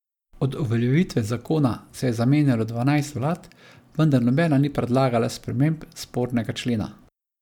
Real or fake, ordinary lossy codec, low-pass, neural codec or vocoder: real; none; 19.8 kHz; none